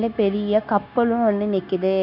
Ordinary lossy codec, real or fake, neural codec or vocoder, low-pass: none; fake; codec, 16 kHz, 0.9 kbps, LongCat-Audio-Codec; 5.4 kHz